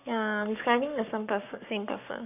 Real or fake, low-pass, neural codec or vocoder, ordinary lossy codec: fake; 3.6 kHz; codec, 44.1 kHz, 7.8 kbps, Pupu-Codec; none